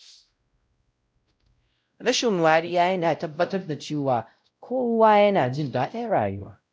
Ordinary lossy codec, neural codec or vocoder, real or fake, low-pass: none; codec, 16 kHz, 0.5 kbps, X-Codec, WavLM features, trained on Multilingual LibriSpeech; fake; none